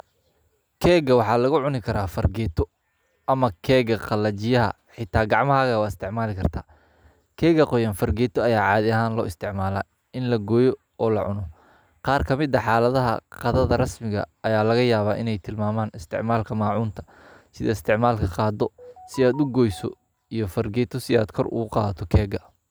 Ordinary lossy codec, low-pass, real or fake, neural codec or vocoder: none; none; real; none